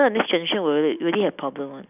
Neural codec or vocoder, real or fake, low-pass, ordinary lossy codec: none; real; 3.6 kHz; none